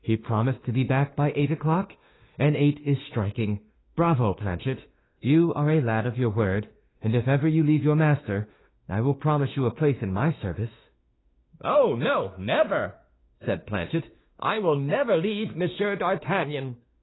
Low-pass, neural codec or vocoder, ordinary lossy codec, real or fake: 7.2 kHz; autoencoder, 48 kHz, 32 numbers a frame, DAC-VAE, trained on Japanese speech; AAC, 16 kbps; fake